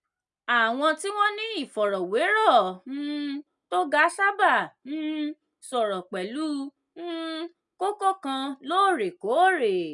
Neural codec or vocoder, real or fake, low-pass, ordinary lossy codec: none; real; 10.8 kHz; none